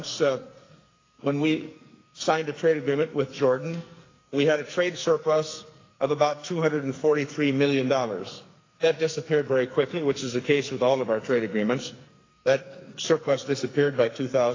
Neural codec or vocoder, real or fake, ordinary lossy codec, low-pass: codec, 44.1 kHz, 2.6 kbps, SNAC; fake; AAC, 32 kbps; 7.2 kHz